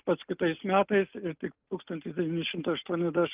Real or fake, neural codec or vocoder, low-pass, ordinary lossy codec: real; none; 3.6 kHz; Opus, 24 kbps